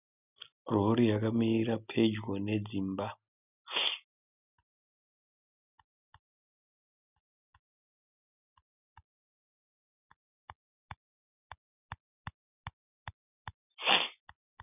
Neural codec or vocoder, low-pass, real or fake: none; 3.6 kHz; real